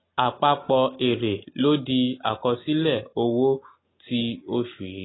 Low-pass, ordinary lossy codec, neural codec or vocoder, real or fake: 7.2 kHz; AAC, 16 kbps; none; real